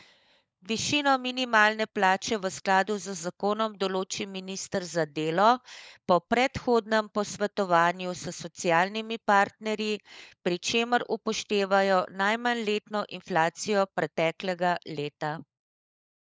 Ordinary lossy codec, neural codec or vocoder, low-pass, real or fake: none; codec, 16 kHz, 16 kbps, FunCodec, trained on LibriTTS, 50 frames a second; none; fake